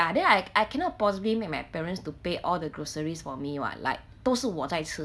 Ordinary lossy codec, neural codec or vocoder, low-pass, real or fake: none; none; none; real